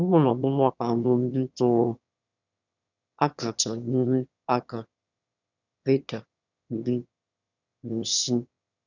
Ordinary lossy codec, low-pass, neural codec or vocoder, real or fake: none; 7.2 kHz; autoencoder, 22.05 kHz, a latent of 192 numbers a frame, VITS, trained on one speaker; fake